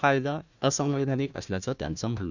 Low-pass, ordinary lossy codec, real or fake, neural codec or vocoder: 7.2 kHz; none; fake; codec, 16 kHz, 1 kbps, FunCodec, trained on Chinese and English, 50 frames a second